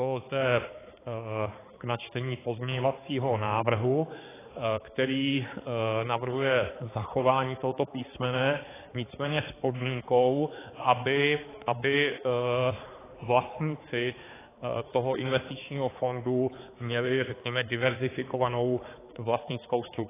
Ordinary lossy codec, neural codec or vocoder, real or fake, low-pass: AAC, 16 kbps; codec, 16 kHz, 4 kbps, X-Codec, HuBERT features, trained on balanced general audio; fake; 3.6 kHz